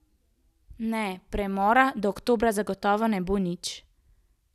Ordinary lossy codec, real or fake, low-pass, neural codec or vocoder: none; real; 14.4 kHz; none